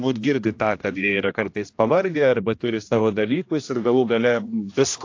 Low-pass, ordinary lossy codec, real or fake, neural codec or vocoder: 7.2 kHz; AAC, 48 kbps; fake; codec, 16 kHz, 1 kbps, X-Codec, HuBERT features, trained on general audio